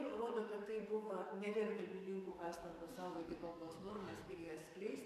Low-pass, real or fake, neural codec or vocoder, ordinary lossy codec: 14.4 kHz; fake; codec, 44.1 kHz, 2.6 kbps, SNAC; AAC, 96 kbps